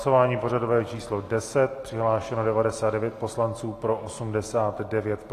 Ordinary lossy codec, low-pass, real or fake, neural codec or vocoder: AAC, 48 kbps; 14.4 kHz; real; none